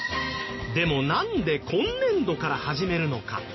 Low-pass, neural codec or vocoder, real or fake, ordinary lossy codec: 7.2 kHz; none; real; MP3, 24 kbps